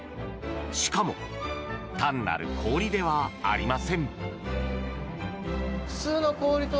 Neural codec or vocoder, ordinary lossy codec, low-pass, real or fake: none; none; none; real